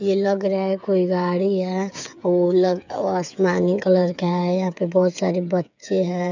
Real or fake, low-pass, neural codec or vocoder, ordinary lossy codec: fake; 7.2 kHz; codec, 16 kHz, 8 kbps, FreqCodec, smaller model; none